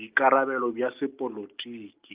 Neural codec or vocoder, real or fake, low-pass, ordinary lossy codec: none; real; 3.6 kHz; Opus, 32 kbps